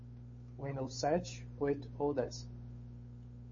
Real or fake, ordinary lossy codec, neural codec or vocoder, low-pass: fake; MP3, 32 kbps; codec, 16 kHz, 8 kbps, FunCodec, trained on Chinese and English, 25 frames a second; 7.2 kHz